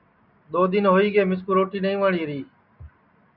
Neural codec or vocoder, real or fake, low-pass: none; real; 5.4 kHz